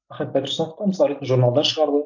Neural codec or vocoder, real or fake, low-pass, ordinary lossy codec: none; real; 7.2 kHz; none